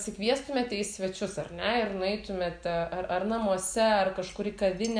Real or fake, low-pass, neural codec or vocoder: real; 9.9 kHz; none